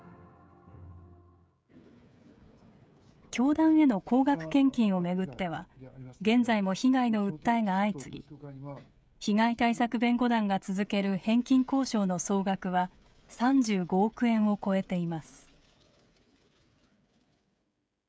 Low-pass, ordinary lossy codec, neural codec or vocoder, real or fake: none; none; codec, 16 kHz, 16 kbps, FreqCodec, smaller model; fake